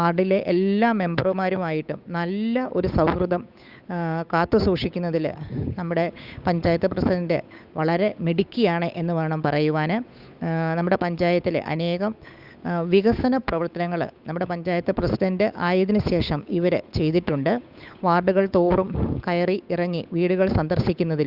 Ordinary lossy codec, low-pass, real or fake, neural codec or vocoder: Opus, 64 kbps; 5.4 kHz; fake; codec, 16 kHz, 8 kbps, FunCodec, trained on Chinese and English, 25 frames a second